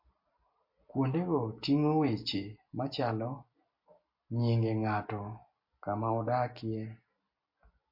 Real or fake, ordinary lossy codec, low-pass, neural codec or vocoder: real; MP3, 32 kbps; 5.4 kHz; none